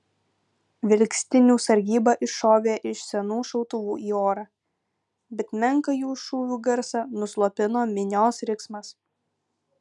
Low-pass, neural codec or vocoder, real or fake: 10.8 kHz; none; real